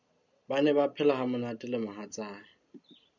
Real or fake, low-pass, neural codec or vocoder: real; 7.2 kHz; none